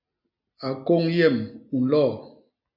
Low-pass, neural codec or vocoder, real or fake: 5.4 kHz; none; real